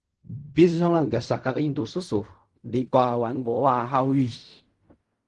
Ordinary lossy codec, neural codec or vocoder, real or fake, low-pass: Opus, 24 kbps; codec, 16 kHz in and 24 kHz out, 0.4 kbps, LongCat-Audio-Codec, fine tuned four codebook decoder; fake; 10.8 kHz